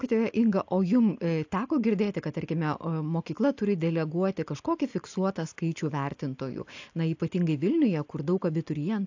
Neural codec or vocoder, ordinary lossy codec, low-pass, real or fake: none; AAC, 48 kbps; 7.2 kHz; real